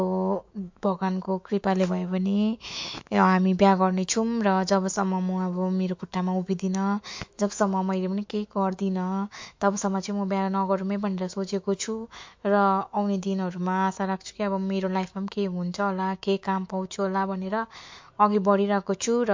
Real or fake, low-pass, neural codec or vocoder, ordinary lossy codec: fake; 7.2 kHz; autoencoder, 48 kHz, 128 numbers a frame, DAC-VAE, trained on Japanese speech; MP3, 48 kbps